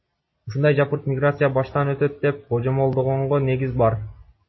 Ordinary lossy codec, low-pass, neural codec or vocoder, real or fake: MP3, 24 kbps; 7.2 kHz; none; real